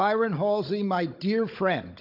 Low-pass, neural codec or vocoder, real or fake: 5.4 kHz; codec, 16 kHz, 16 kbps, FunCodec, trained on Chinese and English, 50 frames a second; fake